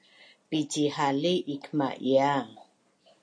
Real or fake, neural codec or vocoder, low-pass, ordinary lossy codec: real; none; 9.9 kHz; MP3, 48 kbps